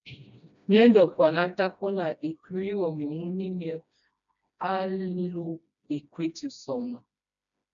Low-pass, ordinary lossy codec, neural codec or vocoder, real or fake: 7.2 kHz; MP3, 96 kbps; codec, 16 kHz, 1 kbps, FreqCodec, smaller model; fake